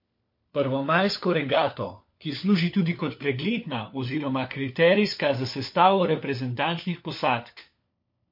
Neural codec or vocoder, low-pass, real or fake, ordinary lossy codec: codec, 16 kHz, 4 kbps, FunCodec, trained on LibriTTS, 50 frames a second; 5.4 kHz; fake; MP3, 32 kbps